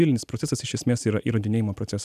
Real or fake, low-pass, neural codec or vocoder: real; 14.4 kHz; none